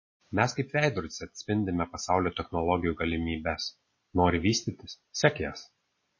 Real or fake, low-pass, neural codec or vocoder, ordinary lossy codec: real; 7.2 kHz; none; MP3, 32 kbps